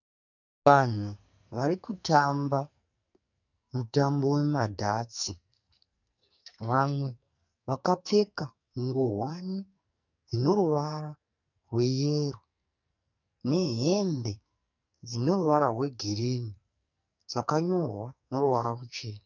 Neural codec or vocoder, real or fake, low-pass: codec, 44.1 kHz, 2.6 kbps, SNAC; fake; 7.2 kHz